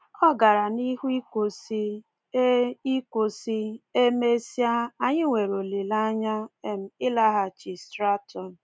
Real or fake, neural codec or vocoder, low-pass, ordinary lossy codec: real; none; none; none